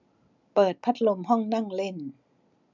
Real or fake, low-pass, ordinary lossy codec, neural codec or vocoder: real; 7.2 kHz; none; none